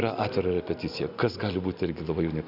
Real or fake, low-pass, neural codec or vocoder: real; 5.4 kHz; none